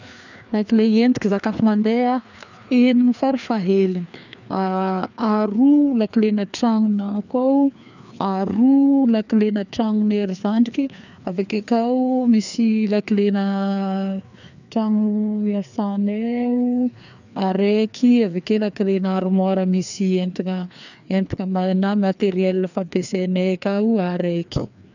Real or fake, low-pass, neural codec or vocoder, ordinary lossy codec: fake; 7.2 kHz; codec, 16 kHz, 2 kbps, FreqCodec, larger model; none